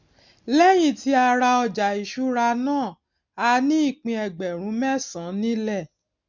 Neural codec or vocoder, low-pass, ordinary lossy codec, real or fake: none; 7.2 kHz; MP3, 64 kbps; real